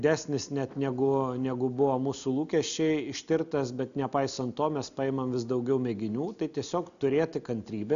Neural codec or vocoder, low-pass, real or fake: none; 7.2 kHz; real